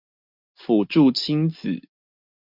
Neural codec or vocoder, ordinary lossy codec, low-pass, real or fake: none; MP3, 48 kbps; 5.4 kHz; real